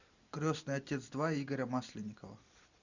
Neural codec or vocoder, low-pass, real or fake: none; 7.2 kHz; real